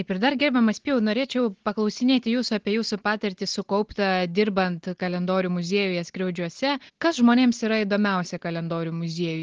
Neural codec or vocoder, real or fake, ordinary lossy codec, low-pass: none; real; Opus, 16 kbps; 7.2 kHz